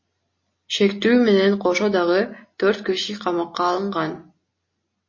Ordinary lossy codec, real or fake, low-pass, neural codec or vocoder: MP3, 32 kbps; real; 7.2 kHz; none